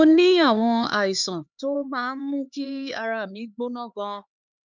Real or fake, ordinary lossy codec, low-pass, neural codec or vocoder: fake; none; 7.2 kHz; codec, 16 kHz, 4 kbps, X-Codec, HuBERT features, trained on LibriSpeech